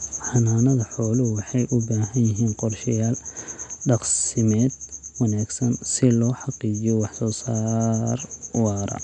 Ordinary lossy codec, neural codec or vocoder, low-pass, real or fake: none; none; 10.8 kHz; real